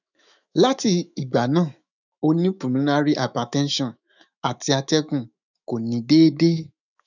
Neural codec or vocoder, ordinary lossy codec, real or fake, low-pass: autoencoder, 48 kHz, 128 numbers a frame, DAC-VAE, trained on Japanese speech; none; fake; 7.2 kHz